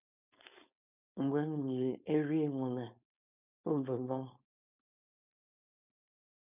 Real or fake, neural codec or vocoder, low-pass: fake; codec, 16 kHz, 4.8 kbps, FACodec; 3.6 kHz